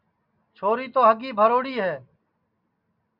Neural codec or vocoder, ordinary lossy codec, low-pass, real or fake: none; Opus, 64 kbps; 5.4 kHz; real